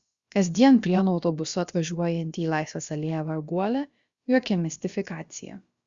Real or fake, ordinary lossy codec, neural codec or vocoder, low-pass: fake; Opus, 64 kbps; codec, 16 kHz, about 1 kbps, DyCAST, with the encoder's durations; 7.2 kHz